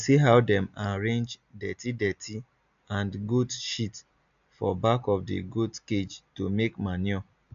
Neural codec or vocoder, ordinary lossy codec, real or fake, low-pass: none; AAC, 96 kbps; real; 7.2 kHz